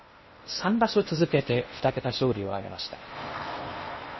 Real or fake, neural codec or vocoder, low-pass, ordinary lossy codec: fake; codec, 16 kHz in and 24 kHz out, 0.6 kbps, FocalCodec, streaming, 2048 codes; 7.2 kHz; MP3, 24 kbps